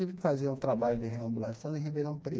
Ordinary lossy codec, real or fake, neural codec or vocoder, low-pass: none; fake; codec, 16 kHz, 2 kbps, FreqCodec, smaller model; none